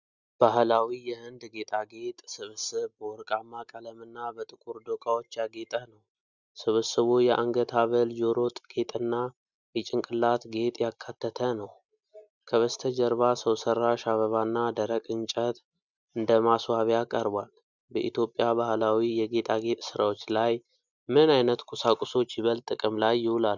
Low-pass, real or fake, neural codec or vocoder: 7.2 kHz; real; none